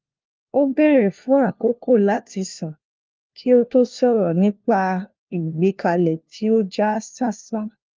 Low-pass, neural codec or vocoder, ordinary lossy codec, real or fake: 7.2 kHz; codec, 16 kHz, 1 kbps, FunCodec, trained on LibriTTS, 50 frames a second; Opus, 32 kbps; fake